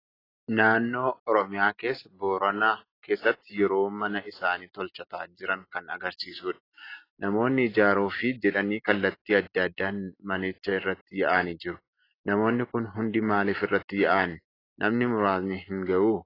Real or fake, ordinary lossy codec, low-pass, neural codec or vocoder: real; AAC, 24 kbps; 5.4 kHz; none